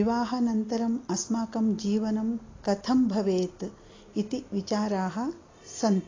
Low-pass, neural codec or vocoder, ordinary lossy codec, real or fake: 7.2 kHz; none; AAC, 32 kbps; real